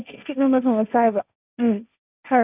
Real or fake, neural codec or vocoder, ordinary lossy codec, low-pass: fake; codec, 16 kHz, 1.1 kbps, Voila-Tokenizer; none; 3.6 kHz